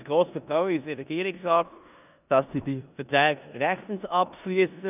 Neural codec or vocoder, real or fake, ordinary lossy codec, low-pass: codec, 16 kHz in and 24 kHz out, 0.9 kbps, LongCat-Audio-Codec, four codebook decoder; fake; none; 3.6 kHz